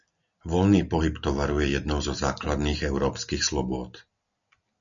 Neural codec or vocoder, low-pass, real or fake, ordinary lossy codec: none; 7.2 kHz; real; MP3, 96 kbps